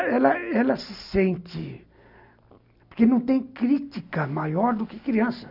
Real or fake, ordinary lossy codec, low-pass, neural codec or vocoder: real; none; 5.4 kHz; none